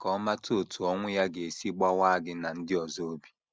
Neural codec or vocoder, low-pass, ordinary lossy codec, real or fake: none; none; none; real